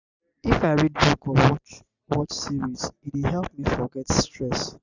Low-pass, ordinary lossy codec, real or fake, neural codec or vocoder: 7.2 kHz; none; real; none